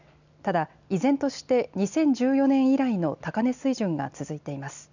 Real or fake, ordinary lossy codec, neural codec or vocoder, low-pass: real; none; none; 7.2 kHz